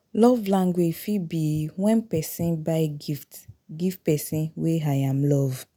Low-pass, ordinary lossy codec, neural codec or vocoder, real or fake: none; none; none; real